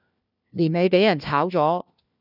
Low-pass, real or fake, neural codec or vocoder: 5.4 kHz; fake; codec, 16 kHz, 1 kbps, FunCodec, trained on LibriTTS, 50 frames a second